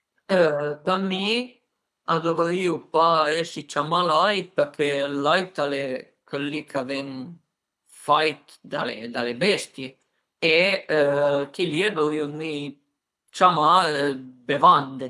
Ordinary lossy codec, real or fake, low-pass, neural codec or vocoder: none; fake; none; codec, 24 kHz, 3 kbps, HILCodec